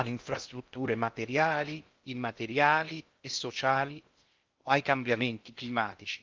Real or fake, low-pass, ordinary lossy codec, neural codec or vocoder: fake; 7.2 kHz; Opus, 32 kbps; codec, 16 kHz in and 24 kHz out, 0.6 kbps, FocalCodec, streaming, 2048 codes